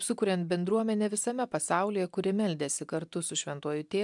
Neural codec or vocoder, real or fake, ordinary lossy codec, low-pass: none; real; MP3, 96 kbps; 10.8 kHz